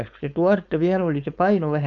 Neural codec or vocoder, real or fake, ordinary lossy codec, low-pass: codec, 16 kHz, 4.8 kbps, FACodec; fake; none; 7.2 kHz